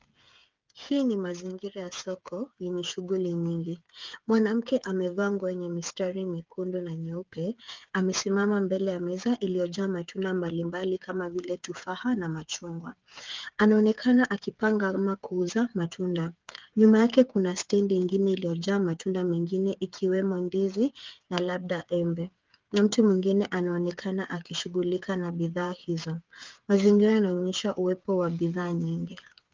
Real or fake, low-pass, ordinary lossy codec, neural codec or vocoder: fake; 7.2 kHz; Opus, 24 kbps; codec, 16 kHz, 8 kbps, FreqCodec, smaller model